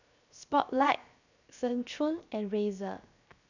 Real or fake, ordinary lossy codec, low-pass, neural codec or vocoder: fake; none; 7.2 kHz; codec, 16 kHz, 0.7 kbps, FocalCodec